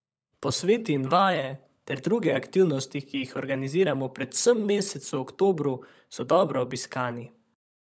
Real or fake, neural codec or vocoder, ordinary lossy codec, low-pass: fake; codec, 16 kHz, 16 kbps, FunCodec, trained on LibriTTS, 50 frames a second; none; none